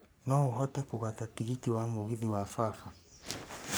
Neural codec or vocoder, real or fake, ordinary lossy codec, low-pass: codec, 44.1 kHz, 3.4 kbps, Pupu-Codec; fake; none; none